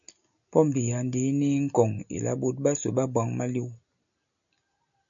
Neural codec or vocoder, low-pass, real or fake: none; 7.2 kHz; real